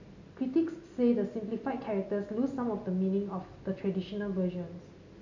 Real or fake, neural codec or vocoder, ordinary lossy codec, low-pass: real; none; MP3, 64 kbps; 7.2 kHz